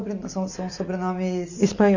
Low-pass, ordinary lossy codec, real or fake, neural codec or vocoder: 7.2 kHz; none; real; none